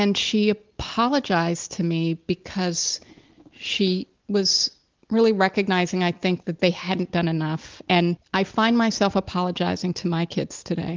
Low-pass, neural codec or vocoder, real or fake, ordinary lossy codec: 7.2 kHz; none; real; Opus, 24 kbps